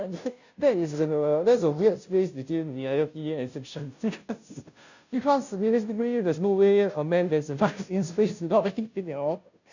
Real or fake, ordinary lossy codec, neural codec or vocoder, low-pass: fake; none; codec, 16 kHz, 0.5 kbps, FunCodec, trained on Chinese and English, 25 frames a second; 7.2 kHz